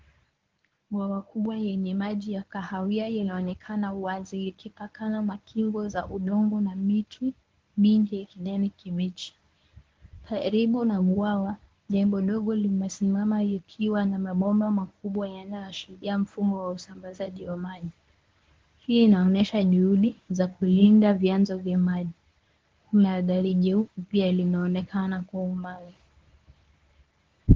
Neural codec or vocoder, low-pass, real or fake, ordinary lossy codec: codec, 24 kHz, 0.9 kbps, WavTokenizer, medium speech release version 1; 7.2 kHz; fake; Opus, 16 kbps